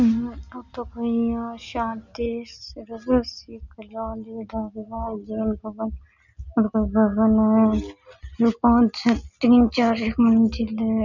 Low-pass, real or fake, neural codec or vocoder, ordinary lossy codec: 7.2 kHz; real; none; none